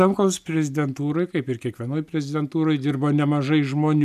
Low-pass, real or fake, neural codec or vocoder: 14.4 kHz; real; none